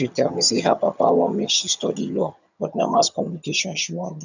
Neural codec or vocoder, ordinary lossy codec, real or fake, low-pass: vocoder, 22.05 kHz, 80 mel bands, HiFi-GAN; none; fake; 7.2 kHz